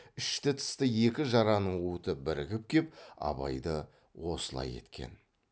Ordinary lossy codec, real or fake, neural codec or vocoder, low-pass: none; real; none; none